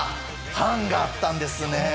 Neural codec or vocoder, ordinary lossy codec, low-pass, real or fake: none; none; none; real